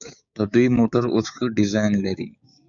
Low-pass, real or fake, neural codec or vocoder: 7.2 kHz; fake; codec, 16 kHz, 6 kbps, DAC